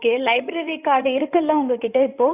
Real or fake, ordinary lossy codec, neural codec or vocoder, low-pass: fake; none; codec, 16 kHz in and 24 kHz out, 2.2 kbps, FireRedTTS-2 codec; 3.6 kHz